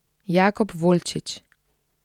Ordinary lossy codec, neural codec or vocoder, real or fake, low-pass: none; vocoder, 48 kHz, 128 mel bands, Vocos; fake; 19.8 kHz